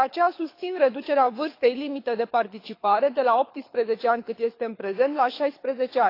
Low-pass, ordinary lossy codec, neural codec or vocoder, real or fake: 5.4 kHz; AAC, 32 kbps; codec, 24 kHz, 6 kbps, HILCodec; fake